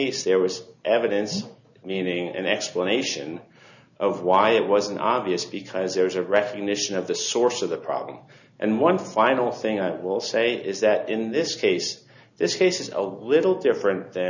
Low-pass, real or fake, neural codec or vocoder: 7.2 kHz; real; none